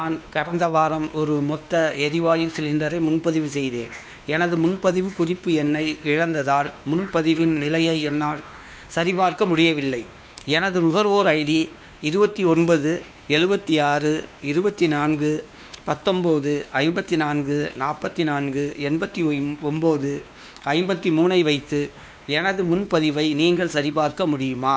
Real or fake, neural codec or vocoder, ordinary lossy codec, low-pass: fake; codec, 16 kHz, 2 kbps, X-Codec, WavLM features, trained on Multilingual LibriSpeech; none; none